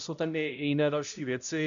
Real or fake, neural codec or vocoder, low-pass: fake; codec, 16 kHz, 0.5 kbps, X-Codec, HuBERT features, trained on balanced general audio; 7.2 kHz